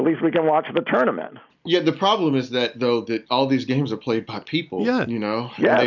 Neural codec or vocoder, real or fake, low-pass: none; real; 7.2 kHz